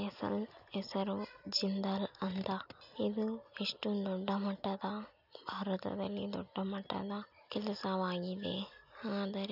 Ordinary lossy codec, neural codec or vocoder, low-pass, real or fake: none; none; 5.4 kHz; real